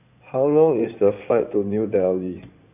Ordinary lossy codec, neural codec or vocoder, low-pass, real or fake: none; codec, 16 kHz in and 24 kHz out, 2.2 kbps, FireRedTTS-2 codec; 3.6 kHz; fake